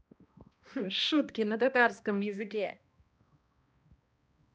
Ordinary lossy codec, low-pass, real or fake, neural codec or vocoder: none; none; fake; codec, 16 kHz, 1 kbps, X-Codec, HuBERT features, trained on balanced general audio